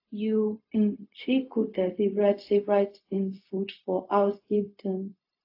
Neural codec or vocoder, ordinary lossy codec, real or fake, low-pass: codec, 16 kHz, 0.4 kbps, LongCat-Audio-Codec; AAC, 32 kbps; fake; 5.4 kHz